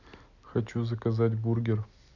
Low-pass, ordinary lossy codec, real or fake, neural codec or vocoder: 7.2 kHz; none; real; none